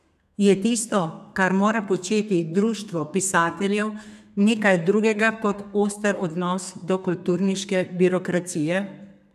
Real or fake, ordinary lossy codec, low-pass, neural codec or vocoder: fake; none; 14.4 kHz; codec, 32 kHz, 1.9 kbps, SNAC